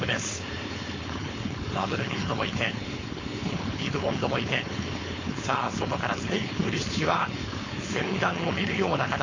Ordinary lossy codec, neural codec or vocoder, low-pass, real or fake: AAC, 32 kbps; codec, 16 kHz, 4.8 kbps, FACodec; 7.2 kHz; fake